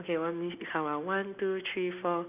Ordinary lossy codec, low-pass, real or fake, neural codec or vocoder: none; 3.6 kHz; real; none